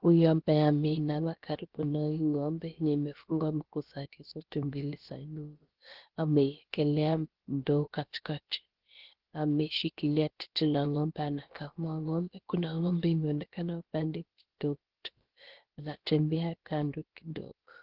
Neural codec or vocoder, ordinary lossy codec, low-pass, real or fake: codec, 16 kHz, about 1 kbps, DyCAST, with the encoder's durations; Opus, 16 kbps; 5.4 kHz; fake